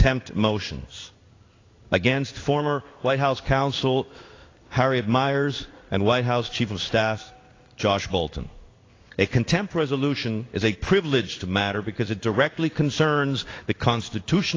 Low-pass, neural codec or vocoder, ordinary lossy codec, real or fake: 7.2 kHz; codec, 16 kHz in and 24 kHz out, 1 kbps, XY-Tokenizer; AAC, 32 kbps; fake